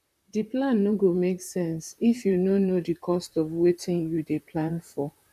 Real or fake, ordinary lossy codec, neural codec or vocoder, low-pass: fake; none; vocoder, 44.1 kHz, 128 mel bands, Pupu-Vocoder; 14.4 kHz